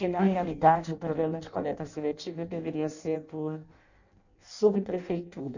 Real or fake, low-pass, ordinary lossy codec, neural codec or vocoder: fake; 7.2 kHz; none; codec, 16 kHz in and 24 kHz out, 0.6 kbps, FireRedTTS-2 codec